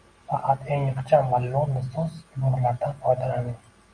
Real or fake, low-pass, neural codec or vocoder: real; 9.9 kHz; none